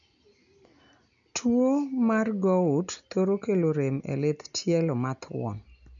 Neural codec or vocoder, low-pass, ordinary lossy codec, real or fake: none; 7.2 kHz; none; real